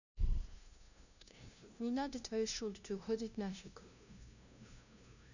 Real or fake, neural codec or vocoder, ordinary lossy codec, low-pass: fake; codec, 16 kHz, 0.5 kbps, FunCodec, trained on LibriTTS, 25 frames a second; none; 7.2 kHz